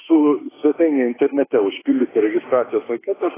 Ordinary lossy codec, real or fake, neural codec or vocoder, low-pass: AAC, 16 kbps; fake; autoencoder, 48 kHz, 32 numbers a frame, DAC-VAE, trained on Japanese speech; 3.6 kHz